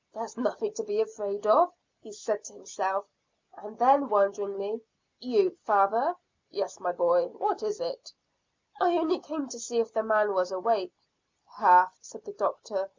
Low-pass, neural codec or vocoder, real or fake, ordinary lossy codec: 7.2 kHz; none; real; MP3, 64 kbps